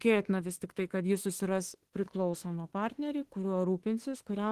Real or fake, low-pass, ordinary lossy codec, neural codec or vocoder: fake; 14.4 kHz; Opus, 16 kbps; autoencoder, 48 kHz, 32 numbers a frame, DAC-VAE, trained on Japanese speech